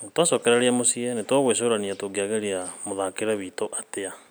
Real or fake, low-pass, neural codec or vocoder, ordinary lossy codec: real; none; none; none